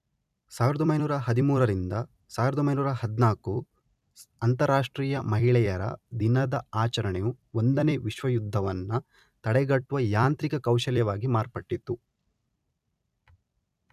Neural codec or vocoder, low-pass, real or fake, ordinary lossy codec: vocoder, 44.1 kHz, 128 mel bands every 256 samples, BigVGAN v2; 14.4 kHz; fake; none